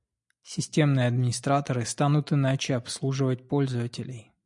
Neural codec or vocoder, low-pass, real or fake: none; 10.8 kHz; real